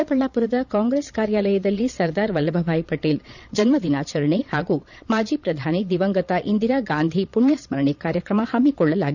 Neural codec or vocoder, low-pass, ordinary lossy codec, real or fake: vocoder, 22.05 kHz, 80 mel bands, Vocos; 7.2 kHz; none; fake